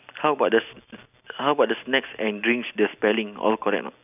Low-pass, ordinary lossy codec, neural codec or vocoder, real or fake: 3.6 kHz; none; none; real